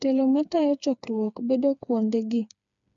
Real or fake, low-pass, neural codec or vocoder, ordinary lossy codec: fake; 7.2 kHz; codec, 16 kHz, 4 kbps, FreqCodec, smaller model; MP3, 96 kbps